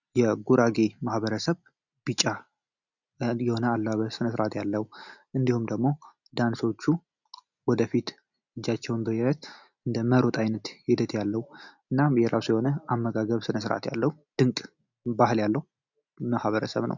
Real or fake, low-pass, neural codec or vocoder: real; 7.2 kHz; none